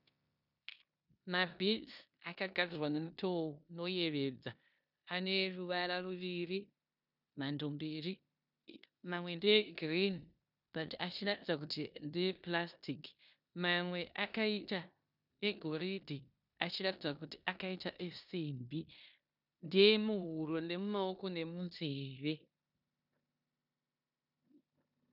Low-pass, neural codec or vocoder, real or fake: 5.4 kHz; codec, 16 kHz in and 24 kHz out, 0.9 kbps, LongCat-Audio-Codec, four codebook decoder; fake